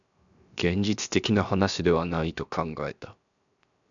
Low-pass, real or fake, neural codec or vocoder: 7.2 kHz; fake; codec, 16 kHz, 0.7 kbps, FocalCodec